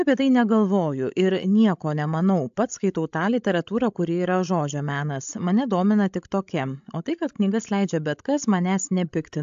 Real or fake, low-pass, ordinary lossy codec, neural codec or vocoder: fake; 7.2 kHz; AAC, 64 kbps; codec, 16 kHz, 16 kbps, FreqCodec, larger model